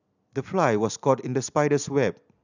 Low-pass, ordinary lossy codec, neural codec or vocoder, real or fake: 7.2 kHz; none; none; real